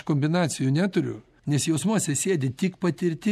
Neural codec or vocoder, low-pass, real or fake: none; 14.4 kHz; real